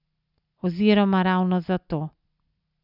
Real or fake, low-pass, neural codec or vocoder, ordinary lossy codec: real; 5.4 kHz; none; none